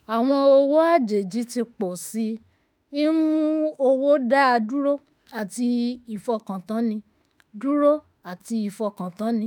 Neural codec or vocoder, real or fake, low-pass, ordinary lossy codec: autoencoder, 48 kHz, 32 numbers a frame, DAC-VAE, trained on Japanese speech; fake; none; none